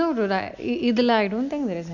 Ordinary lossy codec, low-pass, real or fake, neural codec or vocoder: none; 7.2 kHz; real; none